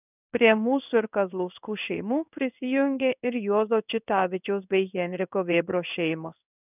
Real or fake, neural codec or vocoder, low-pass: fake; codec, 16 kHz in and 24 kHz out, 1 kbps, XY-Tokenizer; 3.6 kHz